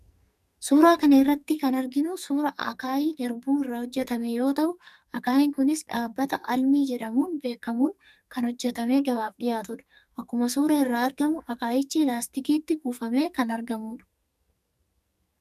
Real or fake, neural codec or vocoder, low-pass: fake; codec, 44.1 kHz, 2.6 kbps, SNAC; 14.4 kHz